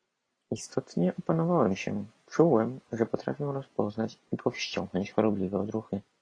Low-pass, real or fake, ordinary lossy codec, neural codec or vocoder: 9.9 kHz; real; AAC, 32 kbps; none